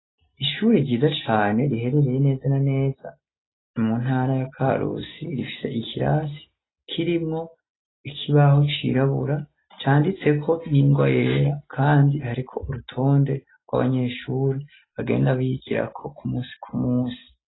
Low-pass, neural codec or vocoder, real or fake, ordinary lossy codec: 7.2 kHz; none; real; AAC, 16 kbps